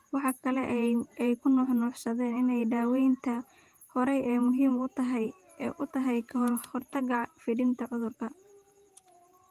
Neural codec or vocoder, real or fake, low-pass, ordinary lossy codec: vocoder, 48 kHz, 128 mel bands, Vocos; fake; 14.4 kHz; Opus, 32 kbps